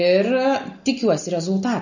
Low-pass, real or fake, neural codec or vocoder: 7.2 kHz; real; none